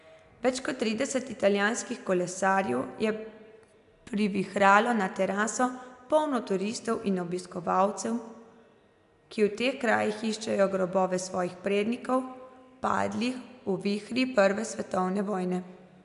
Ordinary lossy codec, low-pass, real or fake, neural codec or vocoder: MP3, 96 kbps; 10.8 kHz; real; none